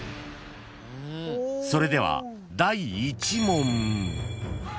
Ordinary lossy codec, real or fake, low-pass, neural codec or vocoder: none; real; none; none